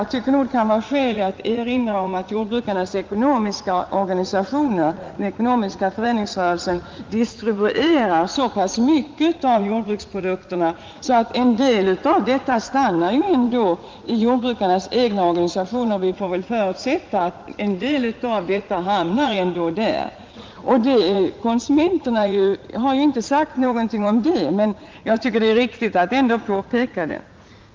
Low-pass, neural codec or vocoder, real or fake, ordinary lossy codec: 7.2 kHz; vocoder, 44.1 kHz, 80 mel bands, Vocos; fake; Opus, 32 kbps